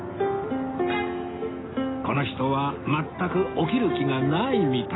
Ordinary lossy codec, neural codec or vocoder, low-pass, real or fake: AAC, 16 kbps; none; 7.2 kHz; real